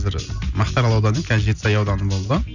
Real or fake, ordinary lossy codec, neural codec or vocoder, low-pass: real; none; none; 7.2 kHz